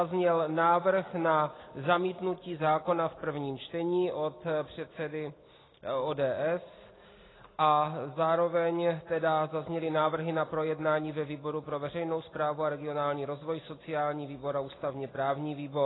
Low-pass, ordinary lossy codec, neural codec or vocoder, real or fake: 7.2 kHz; AAC, 16 kbps; none; real